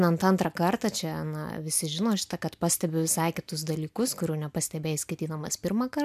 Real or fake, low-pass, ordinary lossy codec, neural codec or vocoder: real; 14.4 kHz; MP3, 96 kbps; none